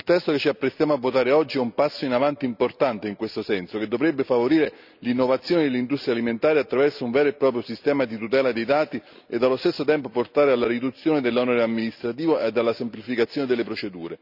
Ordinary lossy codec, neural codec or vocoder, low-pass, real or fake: none; none; 5.4 kHz; real